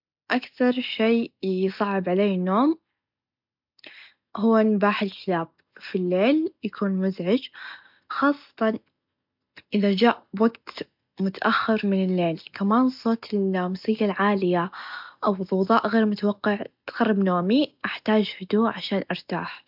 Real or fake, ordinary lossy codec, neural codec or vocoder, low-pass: real; MP3, 48 kbps; none; 5.4 kHz